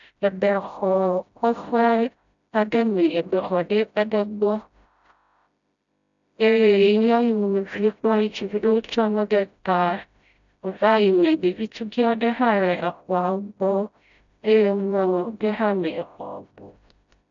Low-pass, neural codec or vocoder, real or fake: 7.2 kHz; codec, 16 kHz, 0.5 kbps, FreqCodec, smaller model; fake